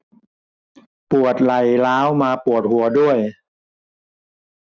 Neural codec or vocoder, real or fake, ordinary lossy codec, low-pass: none; real; none; none